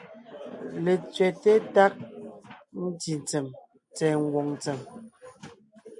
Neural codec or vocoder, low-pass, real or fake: none; 10.8 kHz; real